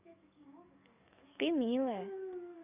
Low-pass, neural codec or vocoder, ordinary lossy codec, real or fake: 3.6 kHz; codec, 44.1 kHz, 7.8 kbps, DAC; none; fake